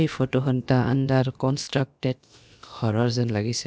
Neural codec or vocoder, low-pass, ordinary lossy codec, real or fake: codec, 16 kHz, about 1 kbps, DyCAST, with the encoder's durations; none; none; fake